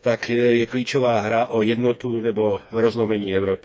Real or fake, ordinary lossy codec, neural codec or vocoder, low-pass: fake; none; codec, 16 kHz, 2 kbps, FreqCodec, smaller model; none